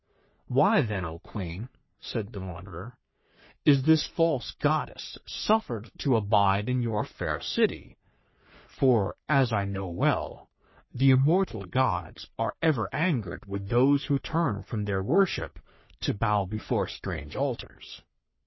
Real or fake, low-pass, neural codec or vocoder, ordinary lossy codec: fake; 7.2 kHz; codec, 44.1 kHz, 3.4 kbps, Pupu-Codec; MP3, 24 kbps